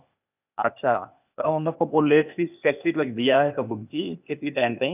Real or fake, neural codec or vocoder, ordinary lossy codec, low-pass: fake; codec, 16 kHz, 0.8 kbps, ZipCodec; none; 3.6 kHz